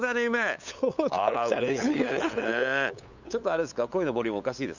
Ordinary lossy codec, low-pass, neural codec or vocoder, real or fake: none; 7.2 kHz; codec, 16 kHz, 8 kbps, FunCodec, trained on LibriTTS, 25 frames a second; fake